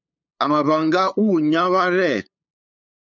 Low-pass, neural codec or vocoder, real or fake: 7.2 kHz; codec, 16 kHz, 8 kbps, FunCodec, trained on LibriTTS, 25 frames a second; fake